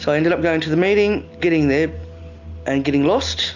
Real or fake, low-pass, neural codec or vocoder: real; 7.2 kHz; none